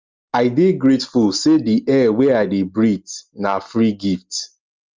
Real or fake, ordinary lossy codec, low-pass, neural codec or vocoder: real; Opus, 32 kbps; 7.2 kHz; none